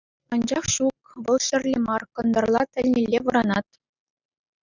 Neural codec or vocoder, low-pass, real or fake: none; 7.2 kHz; real